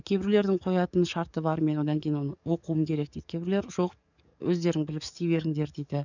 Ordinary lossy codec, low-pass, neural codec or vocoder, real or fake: none; 7.2 kHz; codec, 44.1 kHz, 7.8 kbps, DAC; fake